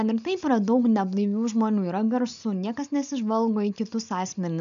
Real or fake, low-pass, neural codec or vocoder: real; 7.2 kHz; none